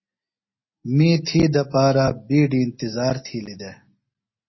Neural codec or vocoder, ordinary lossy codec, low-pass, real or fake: none; MP3, 24 kbps; 7.2 kHz; real